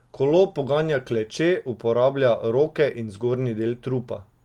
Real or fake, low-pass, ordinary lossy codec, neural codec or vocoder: real; 19.8 kHz; Opus, 24 kbps; none